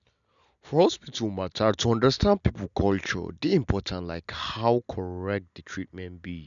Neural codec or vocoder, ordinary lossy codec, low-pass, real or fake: none; none; 7.2 kHz; real